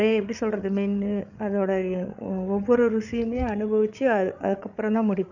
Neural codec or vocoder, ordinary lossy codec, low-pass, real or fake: codec, 16 kHz, 8 kbps, FreqCodec, larger model; none; 7.2 kHz; fake